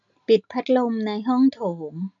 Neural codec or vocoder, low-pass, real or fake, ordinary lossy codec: none; 7.2 kHz; real; none